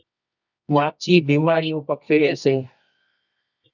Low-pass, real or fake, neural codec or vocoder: 7.2 kHz; fake; codec, 24 kHz, 0.9 kbps, WavTokenizer, medium music audio release